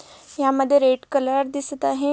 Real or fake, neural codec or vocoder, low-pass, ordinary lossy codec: real; none; none; none